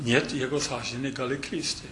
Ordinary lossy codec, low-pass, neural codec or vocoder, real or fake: AAC, 64 kbps; 10.8 kHz; none; real